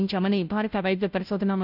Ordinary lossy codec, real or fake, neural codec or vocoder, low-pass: none; fake; codec, 16 kHz, 0.5 kbps, FunCodec, trained on Chinese and English, 25 frames a second; 5.4 kHz